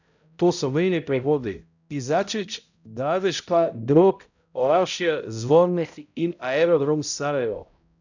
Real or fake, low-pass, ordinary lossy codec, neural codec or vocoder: fake; 7.2 kHz; none; codec, 16 kHz, 0.5 kbps, X-Codec, HuBERT features, trained on balanced general audio